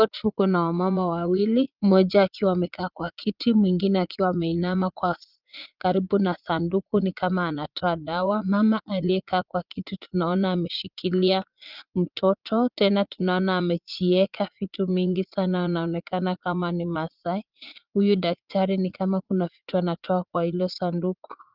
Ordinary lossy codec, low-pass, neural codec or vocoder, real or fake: Opus, 32 kbps; 5.4 kHz; vocoder, 44.1 kHz, 80 mel bands, Vocos; fake